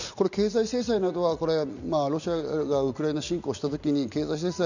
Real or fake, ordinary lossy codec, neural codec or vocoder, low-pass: real; none; none; 7.2 kHz